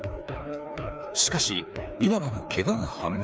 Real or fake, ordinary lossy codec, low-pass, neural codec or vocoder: fake; none; none; codec, 16 kHz, 2 kbps, FreqCodec, larger model